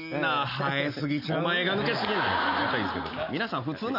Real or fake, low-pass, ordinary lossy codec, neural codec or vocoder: real; 5.4 kHz; none; none